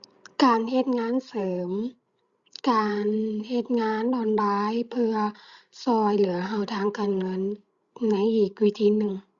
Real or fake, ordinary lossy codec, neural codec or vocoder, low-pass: fake; Opus, 64 kbps; codec, 16 kHz, 16 kbps, FreqCodec, larger model; 7.2 kHz